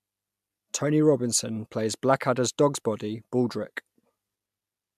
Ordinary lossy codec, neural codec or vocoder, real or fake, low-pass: MP3, 96 kbps; none; real; 14.4 kHz